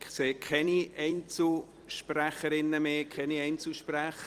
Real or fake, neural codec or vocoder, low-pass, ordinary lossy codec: fake; vocoder, 44.1 kHz, 128 mel bands every 256 samples, BigVGAN v2; 14.4 kHz; Opus, 24 kbps